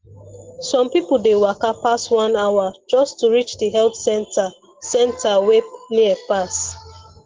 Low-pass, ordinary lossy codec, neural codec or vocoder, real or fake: 7.2 kHz; Opus, 16 kbps; none; real